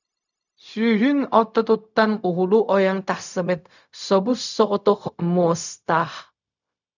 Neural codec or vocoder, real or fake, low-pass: codec, 16 kHz, 0.4 kbps, LongCat-Audio-Codec; fake; 7.2 kHz